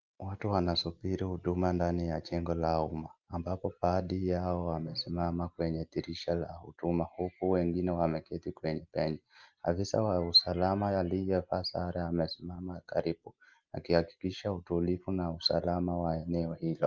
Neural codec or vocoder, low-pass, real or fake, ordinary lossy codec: none; 7.2 kHz; real; Opus, 32 kbps